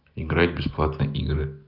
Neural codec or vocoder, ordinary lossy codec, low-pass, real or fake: none; Opus, 24 kbps; 5.4 kHz; real